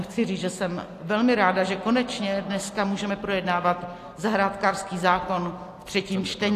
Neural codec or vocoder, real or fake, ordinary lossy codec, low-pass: none; real; AAC, 64 kbps; 14.4 kHz